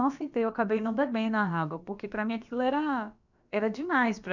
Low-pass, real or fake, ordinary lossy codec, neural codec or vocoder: 7.2 kHz; fake; none; codec, 16 kHz, about 1 kbps, DyCAST, with the encoder's durations